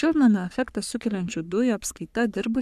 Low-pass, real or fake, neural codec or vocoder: 14.4 kHz; fake; codec, 44.1 kHz, 3.4 kbps, Pupu-Codec